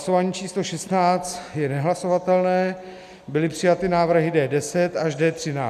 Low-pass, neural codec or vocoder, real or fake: 14.4 kHz; none; real